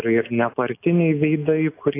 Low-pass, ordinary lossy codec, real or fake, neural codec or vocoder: 3.6 kHz; AAC, 24 kbps; real; none